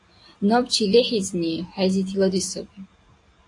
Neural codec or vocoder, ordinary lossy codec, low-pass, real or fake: none; AAC, 48 kbps; 10.8 kHz; real